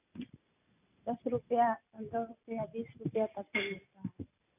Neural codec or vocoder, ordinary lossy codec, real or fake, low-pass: none; none; real; 3.6 kHz